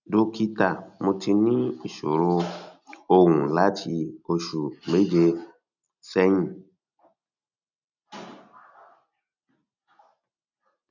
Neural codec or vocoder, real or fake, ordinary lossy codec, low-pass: none; real; none; 7.2 kHz